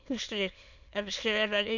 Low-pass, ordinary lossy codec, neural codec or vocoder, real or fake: 7.2 kHz; none; autoencoder, 22.05 kHz, a latent of 192 numbers a frame, VITS, trained on many speakers; fake